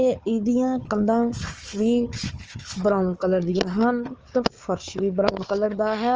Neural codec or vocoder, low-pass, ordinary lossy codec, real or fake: codec, 16 kHz, 4 kbps, FunCodec, trained on Chinese and English, 50 frames a second; 7.2 kHz; Opus, 24 kbps; fake